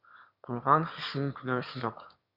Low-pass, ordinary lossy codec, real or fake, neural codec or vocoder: 5.4 kHz; Opus, 64 kbps; fake; autoencoder, 22.05 kHz, a latent of 192 numbers a frame, VITS, trained on one speaker